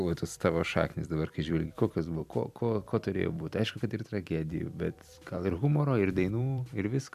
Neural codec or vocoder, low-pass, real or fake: vocoder, 44.1 kHz, 128 mel bands every 256 samples, BigVGAN v2; 14.4 kHz; fake